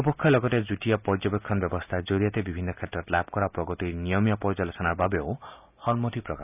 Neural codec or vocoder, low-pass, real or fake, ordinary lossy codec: none; 3.6 kHz; real; none